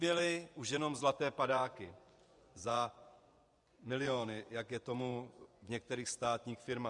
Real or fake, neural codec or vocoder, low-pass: fake; vocoder, 24 kHz, 100 mel bands, Vocos; 10.8 kHz